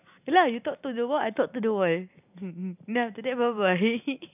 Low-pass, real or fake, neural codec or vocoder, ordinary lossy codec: 3.6 kHz; real; none; none